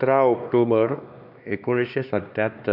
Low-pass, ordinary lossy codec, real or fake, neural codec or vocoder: 5.4 kHz; none; fake; codec, 16 kHz, 2 kbps, X-Codec, HuBERT features, trained on LibriSpeech